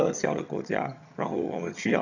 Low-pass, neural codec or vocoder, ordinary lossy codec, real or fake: 7.2 kHz; vocoder, 22.05 kHz, 80 mel bands, HiFi-GAN; none; fake